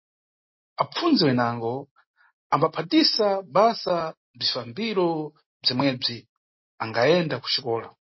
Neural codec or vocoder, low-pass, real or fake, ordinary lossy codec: none; 7.2 kHz; real; MP3, 24 kbps